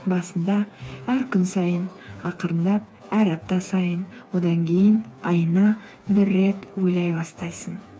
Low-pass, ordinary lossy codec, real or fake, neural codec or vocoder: none; none; fake; codec, 16 kHz, 4 kbps, FreqCodec, smaller model